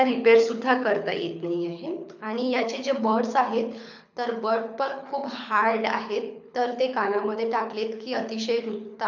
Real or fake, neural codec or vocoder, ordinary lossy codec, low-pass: fake; codec, 24 kHz, 6 kbps, HILCodec; none; 7.2 kHz